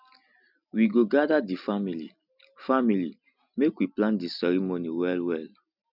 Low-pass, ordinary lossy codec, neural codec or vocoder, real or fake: 5.4 kHz; none; none; real